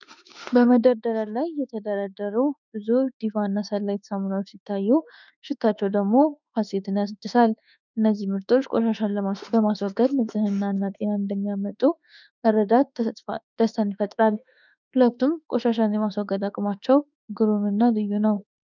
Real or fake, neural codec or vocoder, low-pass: fake; autoencoder, 48 kHz, 32 numbers a frame, DAC-VAE, trained on Japanese speech; 7.2 kHz